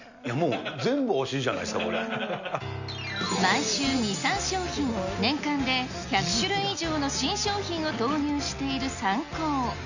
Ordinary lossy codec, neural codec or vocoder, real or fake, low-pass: none; none; real; 7.2 kHz